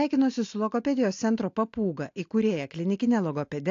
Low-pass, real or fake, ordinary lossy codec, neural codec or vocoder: 7.2 kHz; real; AAC, 48 kbps; none